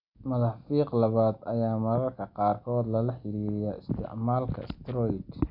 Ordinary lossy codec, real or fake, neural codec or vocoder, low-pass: none; real; none; 5.4 kHz